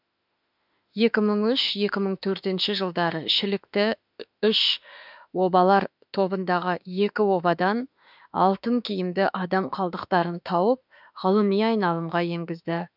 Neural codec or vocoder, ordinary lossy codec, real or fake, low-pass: autoencoder, 48 kHz, 32 numbers a frame, DAC-VAE, trained on Japanese speech; none; fake; 5.4 kHz